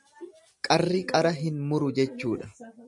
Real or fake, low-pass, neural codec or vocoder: real; 10.8 kHz; none